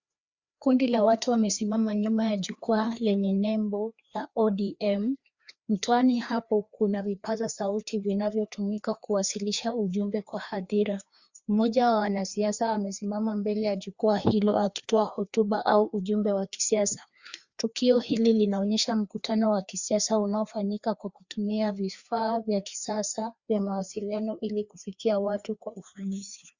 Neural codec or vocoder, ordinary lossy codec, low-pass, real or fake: codec, 16 kHz, 2 kbps, FreqCodec, larger model; Opus, 64 kbps; 7.2 kHz; fake